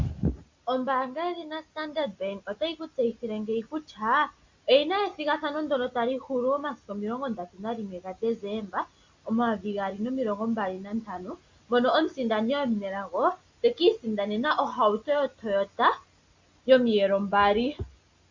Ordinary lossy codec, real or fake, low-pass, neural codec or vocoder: MP3, 48 kbps; real; 7.2 kHz; none